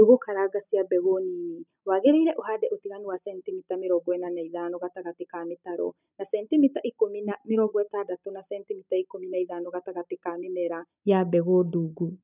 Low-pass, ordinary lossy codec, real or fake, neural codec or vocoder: 3.6 kHz; none; real; none